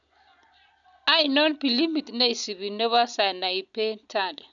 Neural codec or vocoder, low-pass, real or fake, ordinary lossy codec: none; 7.2 kHz; real; none